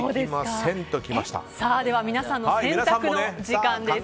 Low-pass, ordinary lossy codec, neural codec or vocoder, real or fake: none; none; none; real